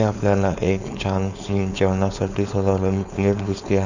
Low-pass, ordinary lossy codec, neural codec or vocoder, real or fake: 7.2 kHz; none; codec, 16 kHz, 4.8 kbps, FACodec; fake